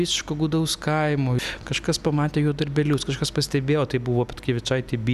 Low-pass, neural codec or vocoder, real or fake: 14.4 kHz; none; real